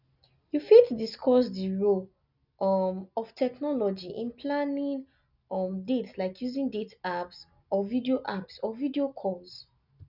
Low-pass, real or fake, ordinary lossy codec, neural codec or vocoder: 5.4 kHz; real; none; none